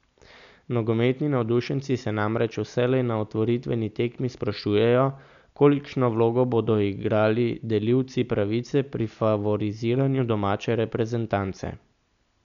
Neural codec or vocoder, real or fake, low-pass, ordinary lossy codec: none; real; 7.2 kHz; none